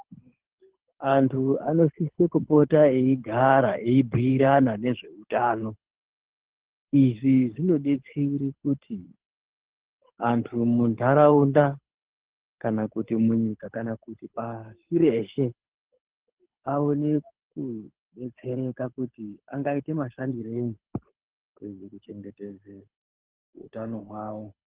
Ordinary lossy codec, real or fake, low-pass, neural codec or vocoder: Opus, 16 kbps; fake; 3.6 kHz; codec, 24 kHz, 6 kbps, HILCodec